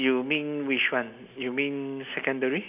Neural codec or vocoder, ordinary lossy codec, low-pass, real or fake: none; none; 3.6 kHz; real